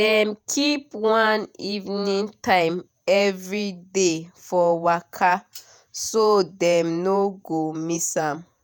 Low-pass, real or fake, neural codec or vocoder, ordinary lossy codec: none; fake; vocoder, 48 kHz, 128 mel bands, Vocos; none